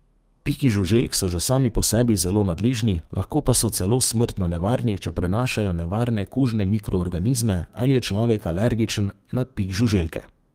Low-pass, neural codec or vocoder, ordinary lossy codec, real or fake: 14.4 kHz; codec, 32 kHz, 1.9 kbps, SNAC; Opus, 32 kbps; fake